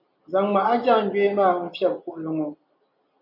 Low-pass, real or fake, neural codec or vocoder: 5.4 kHz; real; none